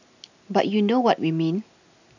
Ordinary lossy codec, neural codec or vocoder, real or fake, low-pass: none; none; real; 7.2 kHz